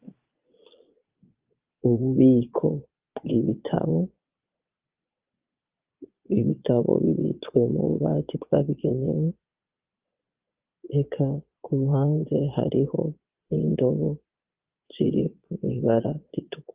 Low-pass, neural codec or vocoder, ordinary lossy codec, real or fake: 3.6 kHz; vocoder, 22.05 kHz, 80 mel bands, Vocos; Opus, 24 kbps; fake